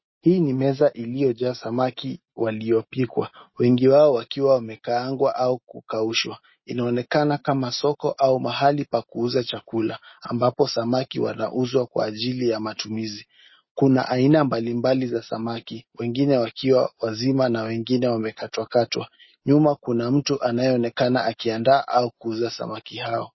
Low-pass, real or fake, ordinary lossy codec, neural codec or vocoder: 7.2 kHz; real; MP3, 24 kbps; none